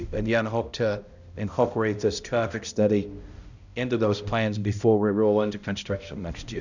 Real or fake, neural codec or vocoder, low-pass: fake; codec, 16 kHz, 0.5 kbps, X-Codec, HuBERT features, trained on balanced general audio; 7.2 kHz